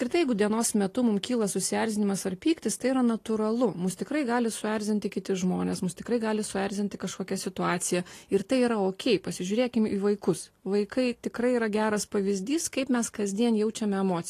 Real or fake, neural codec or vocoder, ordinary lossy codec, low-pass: real; none; AAC, 48 kbps; 14.4 kHz